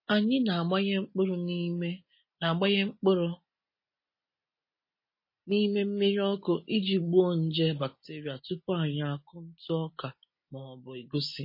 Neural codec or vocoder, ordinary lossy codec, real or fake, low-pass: none; MP3, 24 kbps; real; 5.4 kHz